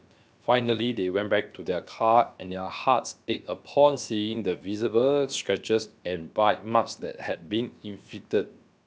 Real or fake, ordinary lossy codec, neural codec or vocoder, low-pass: fake; none; codec, 16 kHz, about 1 kbps, DyCAST, with the encoder's durations; none